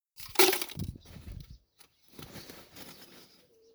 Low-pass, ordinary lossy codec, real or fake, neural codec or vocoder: none; none; fake; vocoder, 44.1 kHz, 128 mel bands, Pupu-Vocoder